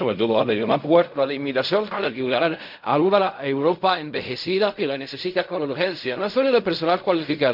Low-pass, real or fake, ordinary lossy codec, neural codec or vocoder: 5.4 kHz; fake; MP3, 48 kbps; codec, 16 kHz in and 24 kHz out, 0.4 kbps, LongCat-Audio-Codec, fine tuned four codebook decoder